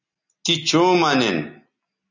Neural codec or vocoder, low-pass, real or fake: none; 7.2 kHz; real